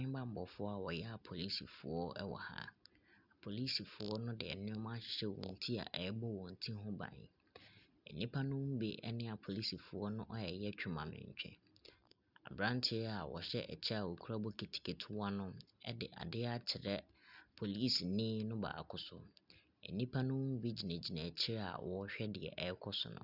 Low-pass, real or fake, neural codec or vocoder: 5.4 kHz; real; none